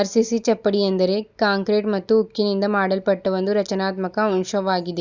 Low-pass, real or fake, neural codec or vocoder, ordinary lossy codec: 7.2 kHz; real; none; Opus, 64 kbps